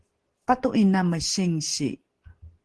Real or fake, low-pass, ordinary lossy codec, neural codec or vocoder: real; 10.8 kHz; Opus, 16 kbps; none